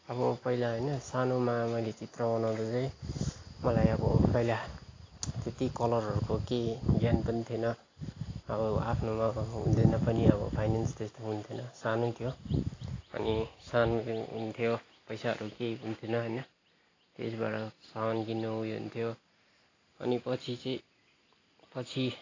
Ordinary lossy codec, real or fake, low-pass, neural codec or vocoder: AAC, 32 kbps; real; 7.2 kHz; none